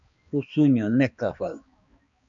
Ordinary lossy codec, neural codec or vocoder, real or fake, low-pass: MP3, 64 kbps; codec, 16 kHz, 2 kbps, X-Codec, HuBERT features, trained on balanced general audio; fake; 7.2 kHz